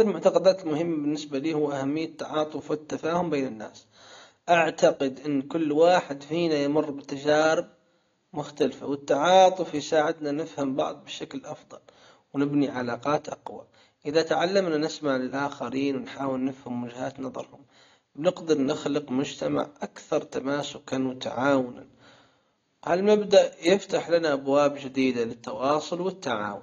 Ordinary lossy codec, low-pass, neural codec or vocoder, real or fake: AAC, 32 kbps; 7.2 kHz; none; real